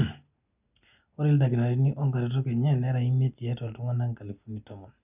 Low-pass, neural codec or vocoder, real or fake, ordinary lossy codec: 3.6 kHz; none; real; none